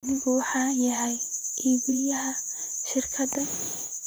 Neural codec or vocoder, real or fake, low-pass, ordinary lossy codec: vocoder, 44.1 kHz, 128 mel bands, Pupu-Vocoder; fake; none; none